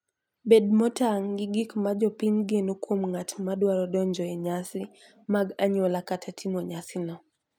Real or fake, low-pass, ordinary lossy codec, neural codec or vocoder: real; 19.8 kHz; none; none